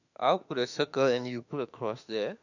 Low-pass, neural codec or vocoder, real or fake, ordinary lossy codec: 7.2 kHz; autoencoder, 48 kHz, 32 numbers a frame, DAC-VAE, trained on Japanese speech; fake; none